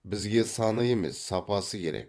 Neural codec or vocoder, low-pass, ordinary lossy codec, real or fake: vocoder, 22.05 kHz, 80 mel bands, WaveNeXt; none; none; fake